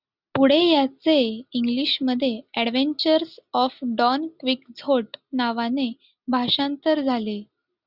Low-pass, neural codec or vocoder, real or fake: 5.4 kHz; none; real